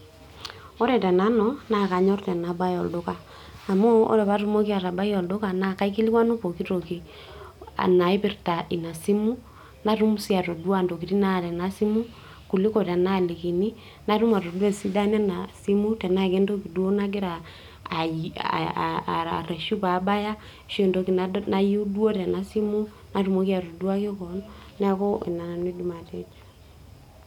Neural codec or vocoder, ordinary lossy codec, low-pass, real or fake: none; none; 19.8 kHz; real